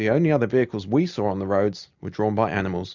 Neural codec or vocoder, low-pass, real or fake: none; 7.2 kHz; real